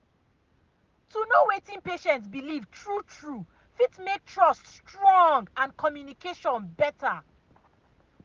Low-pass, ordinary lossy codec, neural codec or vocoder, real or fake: 7.2 kHz; Opus, 32 kbps; none; real